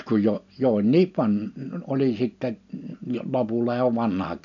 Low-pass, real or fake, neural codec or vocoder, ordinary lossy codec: 7.2 kHz; real; none; none